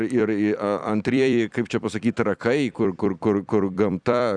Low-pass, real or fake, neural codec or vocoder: 9.9 kHz; fake; vocoder, 44.1 kHz, 128 mel bands every 256 samples, BigVGAN v2